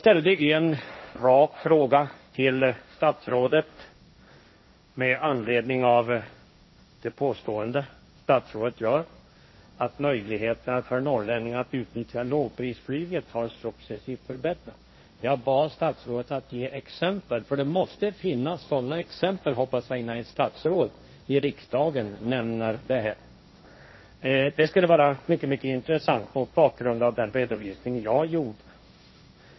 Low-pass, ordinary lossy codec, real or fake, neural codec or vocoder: 7.2 kHz; MP3, 24 kbps; fake; codec, 16 kHz, 1.1 kbps, Voila-Tokenizer